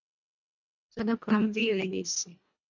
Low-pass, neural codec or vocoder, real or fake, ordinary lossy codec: 7.2 kHz; codec, 24 kHz, 1.5 kbps, HILCodec; fake; MP3, 64 kbps